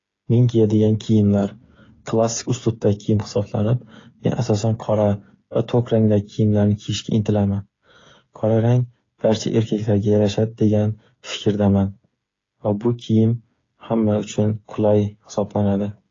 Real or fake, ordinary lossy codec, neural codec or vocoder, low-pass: fake; AAC, 32 kbps; codec, 16 kHz, 8 kbps, FreqCodec, smaller model; 7.2 kHz